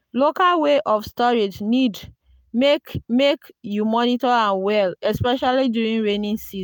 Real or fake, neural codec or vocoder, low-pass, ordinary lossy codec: real; none; 19.8 kHz; none